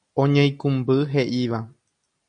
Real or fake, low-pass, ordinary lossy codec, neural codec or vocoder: real; 9.9 kHz; MP3, 64 kbps; none